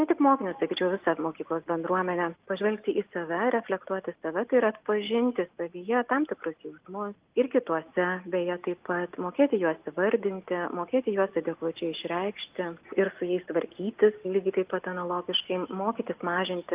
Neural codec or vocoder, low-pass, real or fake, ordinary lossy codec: none; 3.6 kHz; real; Opus, 32 kbps